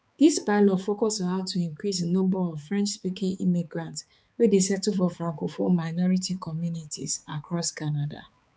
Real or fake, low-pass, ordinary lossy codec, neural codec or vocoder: fake; none; none; codec, 16 kHz, 4 kbps, X-Codec, HuBERT features, trained on balanced general audio